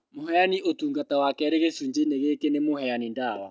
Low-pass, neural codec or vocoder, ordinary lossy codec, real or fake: none; none; none; real